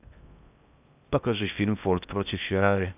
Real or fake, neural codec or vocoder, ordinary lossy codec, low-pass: fake; codec, 16 kHz in and 24 kHz out, 0.6 kbps, FocalCodec, streaming, 4096 codes; none; 3.6 kHz